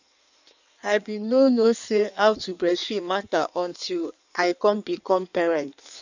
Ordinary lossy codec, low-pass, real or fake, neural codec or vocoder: none; 7.2 kHz; fake; codec, 16 kHz in and 24 kHz out, 1.1 kbps, FireRedTTS-2 codec